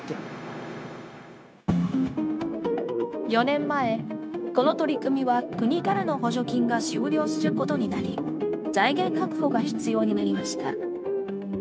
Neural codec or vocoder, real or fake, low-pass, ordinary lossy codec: codec, 16 kHz, 0.9 kbps, LongCat-Audio-Codec; fake; none; none